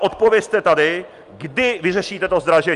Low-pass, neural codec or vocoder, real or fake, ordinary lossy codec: 10.8 kHz; none; real; Opus, 32 kbps